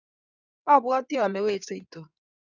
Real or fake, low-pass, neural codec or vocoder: fake; 7.2 kHz; codec, 16 kHz in and 24 kHz out, 2.2 kbps, FireRedTTS-2 codec